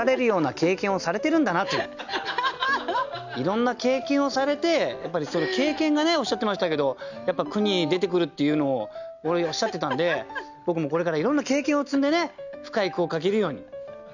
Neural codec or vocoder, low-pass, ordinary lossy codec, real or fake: none; 7.2 kHz; none; real